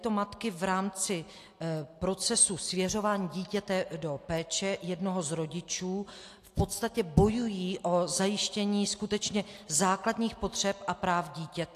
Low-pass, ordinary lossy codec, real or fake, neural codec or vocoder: 14.4 kHz; AAC, 64 kbps; real; none